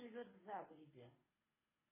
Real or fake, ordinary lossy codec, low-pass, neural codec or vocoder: fake; MP3, 16 kbps; 3.6 kHz; codec, 24 kHz, 6 kbps, HILCodec